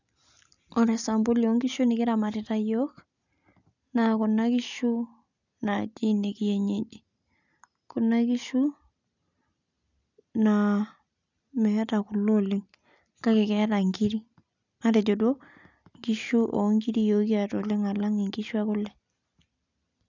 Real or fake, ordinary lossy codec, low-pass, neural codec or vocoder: real; none; 7.2 kHz; none